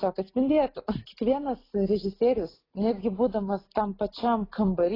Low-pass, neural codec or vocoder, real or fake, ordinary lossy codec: 5.4 kHz; none; real; AAC, 24 kbps